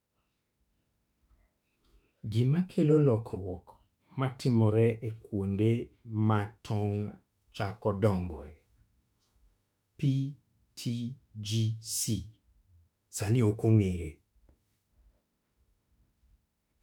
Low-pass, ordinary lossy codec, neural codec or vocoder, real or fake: 19.8 kHz; none; autoencoder, 48 kHz, 32 numbers a frame, DAC-VAE, trained on Japanese speech; fake